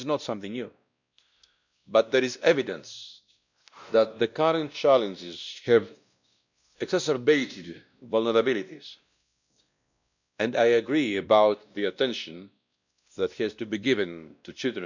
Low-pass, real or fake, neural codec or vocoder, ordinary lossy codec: 7.2 kHz; fake; codec, 16 kHz, 1 kbps, X-Codec, WavLM features, trained on Multilingual LibriSpeech; none